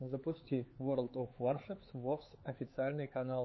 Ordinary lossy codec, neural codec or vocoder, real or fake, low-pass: MP3, 24 kbps; codec, 16 kHz, 4 kbps, X-Codec, HuBERT features, trained on LibriSpeech; fake; 7.2 kHz